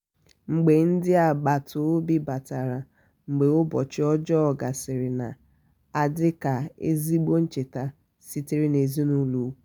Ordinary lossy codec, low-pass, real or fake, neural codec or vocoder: none; none; real; none